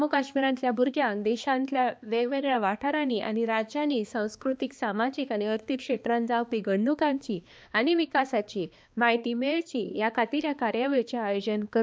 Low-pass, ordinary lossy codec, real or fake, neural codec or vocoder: none; none; fake; codec, 16 kHz, 2 kbps, X-Codec, HuBERT features, trained on balanced general audio